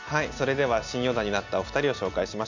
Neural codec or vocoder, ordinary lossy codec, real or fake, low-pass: none; none; real; 7.2 kHz